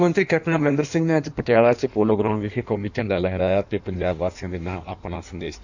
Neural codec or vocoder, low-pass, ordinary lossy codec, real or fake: codec, 16 kHz in and 24 kHz out, 1.1 kbps, FireRedTTS-2 codec; 7.2 kHz; none; fake